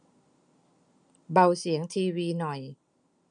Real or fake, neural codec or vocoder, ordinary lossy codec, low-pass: real; none; none; 9.9 kHz